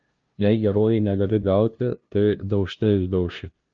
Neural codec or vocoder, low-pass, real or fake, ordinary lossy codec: codec, 16 kHz, 0.5 kbps, FunCodec, trained on LibriTTS, 25 frames a second; 7.2 kHz; fake; Opus, 24 kbps